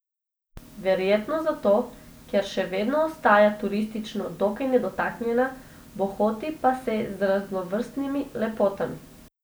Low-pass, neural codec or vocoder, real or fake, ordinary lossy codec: none; none; real; none